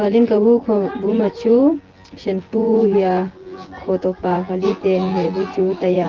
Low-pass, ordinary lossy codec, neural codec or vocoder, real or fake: 7.2 kHz; Opus, 16 kbps; vocoder, 24 kHz, 100 mel bands, Vocos; fake